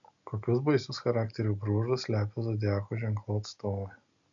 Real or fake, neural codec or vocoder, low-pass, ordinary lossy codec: real; none; 7.2 kHz; AAC, 64 kbps